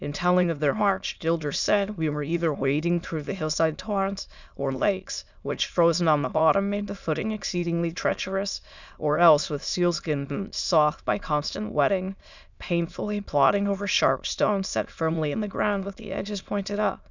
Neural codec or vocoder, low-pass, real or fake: autoencoder, 22.05 kHz, a latent of 192 numbers a frame, VITS, trained on many speakers; 7.2 kHz; fake